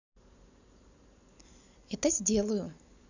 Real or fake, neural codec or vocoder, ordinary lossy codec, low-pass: real; none; none; 7.2 kHz